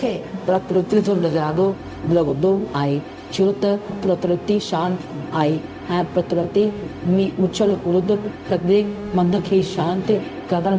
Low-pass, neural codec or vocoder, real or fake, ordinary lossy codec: none; codec, 16 kHz, 0.4 kbps, LongCat-Audio-Codec; fake; none